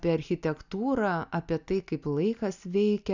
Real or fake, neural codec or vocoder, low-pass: real; none; 7.2 kHz